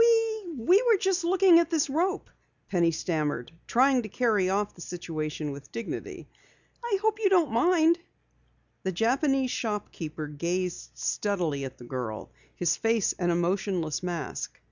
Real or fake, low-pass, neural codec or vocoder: real; 7.2 kHz; none